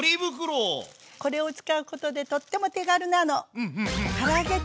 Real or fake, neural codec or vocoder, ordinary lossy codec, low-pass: real; none; none; none